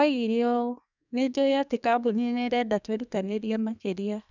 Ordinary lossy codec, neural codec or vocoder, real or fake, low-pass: none; codec, 32 kHz, 1.9 kbps, SNAC; fake; 7.2 kHz